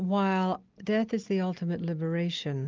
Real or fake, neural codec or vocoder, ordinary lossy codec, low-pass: real; none; Opus, 24 kbps; 7.2 kHz